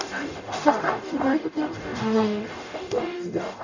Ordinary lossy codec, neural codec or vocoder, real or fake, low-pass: none; codec, 44.1 kHz, 0.9 kbps, DAC; fake; 7.2 kHz